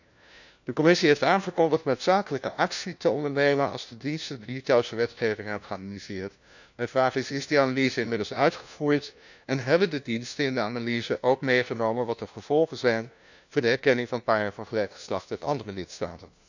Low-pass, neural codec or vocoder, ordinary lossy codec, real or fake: 7.2 kHz; codec, 16 kHz, 1 kbps, FunCodec, trained on LibriTTS, 50 frames a second; none; fake